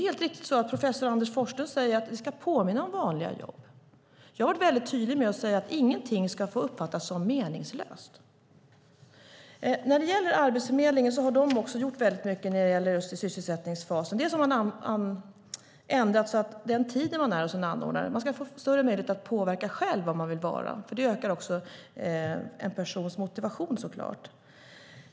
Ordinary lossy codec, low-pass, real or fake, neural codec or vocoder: none; none; real; none